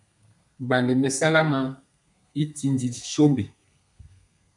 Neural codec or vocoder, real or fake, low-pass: codec, 32 kHz, 1.9 kbps, SNAC; fake; 10.8 kHz